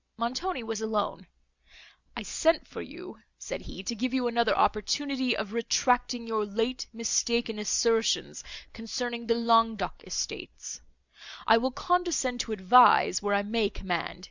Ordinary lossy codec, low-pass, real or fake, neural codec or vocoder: Opus, 64 kbps; 7.2 kHz; real; none